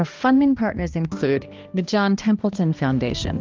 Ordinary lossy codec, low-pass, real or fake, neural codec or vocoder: Opus, 32 kbps; 7.2 kHz; fake; codec, 16 kHz, 1 kbps, X-Codec, HuBERT features, trained on balanced general audio